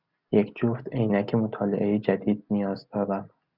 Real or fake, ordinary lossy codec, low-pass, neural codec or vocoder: real; Opus, 64 kbps; 5.4 kHz; none